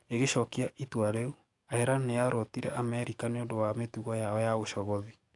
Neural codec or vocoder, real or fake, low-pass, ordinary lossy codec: codec, 44.1 kHz, 7.8 kbps, DAC; fake; 10.8 kHz; AAC, 64 kbps